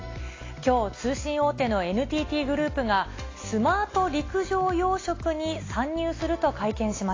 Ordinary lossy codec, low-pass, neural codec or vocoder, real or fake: AAC, 32 kbps; 7.2 kHz; none; real